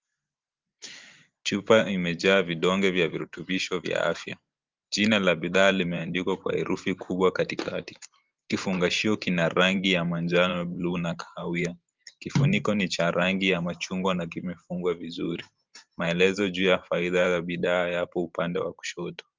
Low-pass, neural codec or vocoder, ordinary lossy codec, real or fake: 7.2 kHz; vocoder, 44.1 kHz, 128 mel bands every 512 samples, BigVGAN v2; Opus, 32 kbps; fake